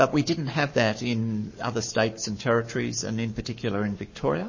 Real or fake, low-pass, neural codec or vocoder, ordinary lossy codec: fake; 7.2 kHz; codec, 44.1 kHz, 7.8 kbps, Pupu-Codec; MP3, 32 kbps